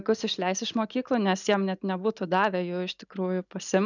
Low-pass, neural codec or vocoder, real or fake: 7.2 kHz; none; real